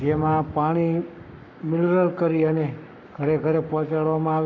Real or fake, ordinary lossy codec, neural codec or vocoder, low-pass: fake; none; codec, 44.1 kHz, 7.8 kbps, Pupu-Codec; 7.2 kHz